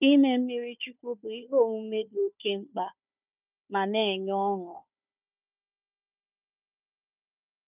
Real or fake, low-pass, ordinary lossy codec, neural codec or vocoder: fake; 3.6 kHz; none; codec, 16 kHz, 4 kbps, FunCodec, trained on Chinese and English, 50 frames a second